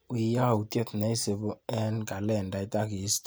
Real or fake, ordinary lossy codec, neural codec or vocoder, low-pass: real; none; none; none